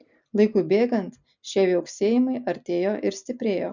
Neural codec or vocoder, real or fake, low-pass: none; real; 7.2 kHz